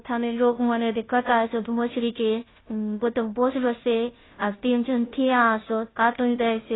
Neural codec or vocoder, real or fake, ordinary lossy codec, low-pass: codec, 16 kHz, 0.5 kbps, FunCodec, trained on Chinese and English, 25 frames a second; fake; AAC, 16 kbps; 7.2 kHz